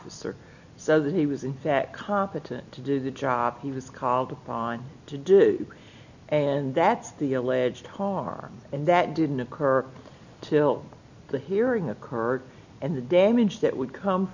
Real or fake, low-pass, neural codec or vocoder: real; 7.2 kHz; none